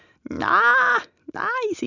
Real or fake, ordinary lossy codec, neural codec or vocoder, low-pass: real; none; none; 7.2 kHz